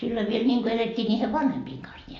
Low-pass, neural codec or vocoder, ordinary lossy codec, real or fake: 7.2 kHz; none; AAC, 32 kbps; real